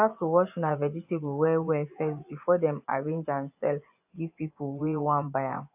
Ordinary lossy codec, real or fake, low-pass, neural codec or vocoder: AAC, 32 kbps; fake; 3.6 kHz; vocoder, 24 kHz, 100 mel bands, Vocos